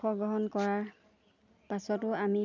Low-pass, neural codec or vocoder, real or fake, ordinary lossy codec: 7.2 kHz; none; real; none